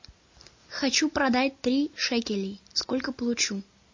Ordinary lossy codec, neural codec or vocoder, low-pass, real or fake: MP3, 32 kbps; none; 7.2 kHz; real